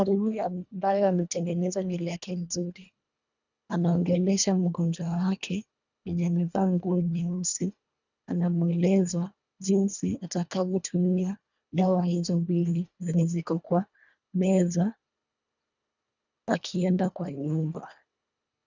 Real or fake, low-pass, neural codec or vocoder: fake; 7.2 kHz; codec, 24 kHz, 1.5 kbps, HILCodec